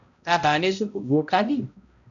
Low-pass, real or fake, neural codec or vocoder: 7.2 kHz; fake; codec, 16 kHz, 0.5 kbps, X-Codec, HuBERT features, trained on balanced general audio